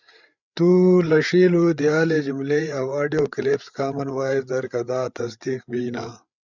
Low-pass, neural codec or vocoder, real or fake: 7.2 kHz; codec, 16 kHz, 8 kbps, FreqCodec, larger model; fake